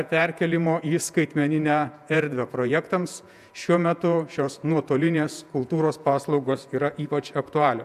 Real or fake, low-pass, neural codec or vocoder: fake; 14.4 kHz; vocoder, 48 kHz, 128 mel bands, Vocos